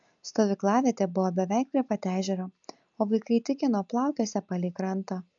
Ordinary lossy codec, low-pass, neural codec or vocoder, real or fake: MP3, 64 kbps; 7.2 kHz; none; real